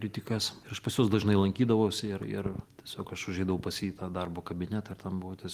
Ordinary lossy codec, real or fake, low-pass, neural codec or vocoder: Opus, 24 kbps; real; 14.4 kHz; none